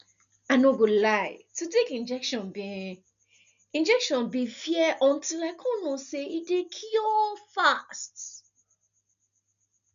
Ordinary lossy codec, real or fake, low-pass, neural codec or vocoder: none; real; 7.2 kHz; none